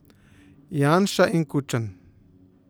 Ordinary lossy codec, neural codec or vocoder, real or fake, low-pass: none; none; real; none